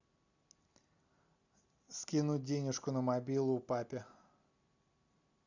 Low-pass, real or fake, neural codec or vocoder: 7.2 kHz; real; none